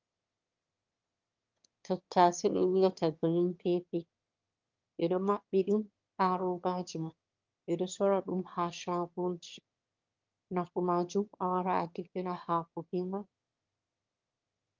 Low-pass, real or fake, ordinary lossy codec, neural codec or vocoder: 7.2 kHz; fake; Opus, 24 kbps; autoencoder, 22.05 kHz, a latent of 192 numbers a frame, VITS, trained on one speaker